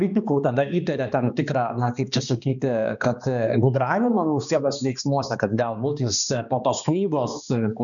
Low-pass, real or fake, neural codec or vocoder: 7.2 kHz; fake; codec, 16 kHz, 2 kbps, X-Codec, HuBERT features, trained on balanced general audio